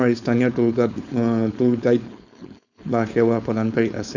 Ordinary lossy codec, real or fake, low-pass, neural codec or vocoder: none; fake; 7.2 kHz; codec, 16 kHz, 4.8 kbps, FACodec